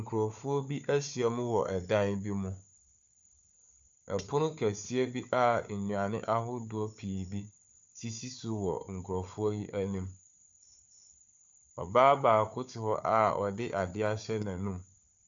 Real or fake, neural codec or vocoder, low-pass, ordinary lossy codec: fake; codec, 16 kHz, 4 kbps, FunCodec, trained on Chinese and English, 50 frames a second; 7.2 kHz; AAC, 64 kbps